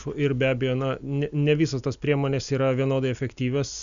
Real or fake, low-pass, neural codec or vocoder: real; 7.2 kHz; none